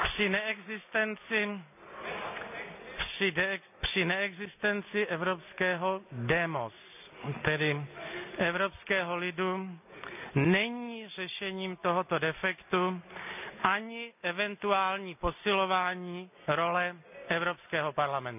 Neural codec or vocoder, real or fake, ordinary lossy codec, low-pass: none; real; none; 3.6 kHz